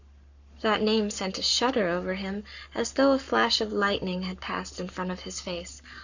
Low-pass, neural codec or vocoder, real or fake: 7.2 kHz; codec, 44.1 kHz, 7.8 kbps, Pupu-Codec; fake